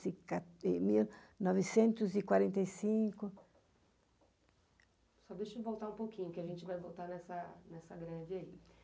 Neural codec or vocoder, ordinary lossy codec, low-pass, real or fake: none; none; none; real